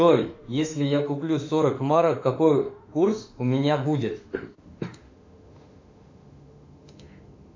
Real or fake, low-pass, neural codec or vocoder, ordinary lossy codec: fake; 7.2 kHz; autoencoder, 48 kHz, 32 numbers a frame, DAC-VAE, trained on Japanese speech; MP3, 64 kbps